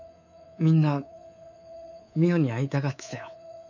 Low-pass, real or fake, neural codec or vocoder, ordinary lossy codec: 7.2 kHz; fake; codec, 24 kHz, 3.1 kbps, DualCodec; none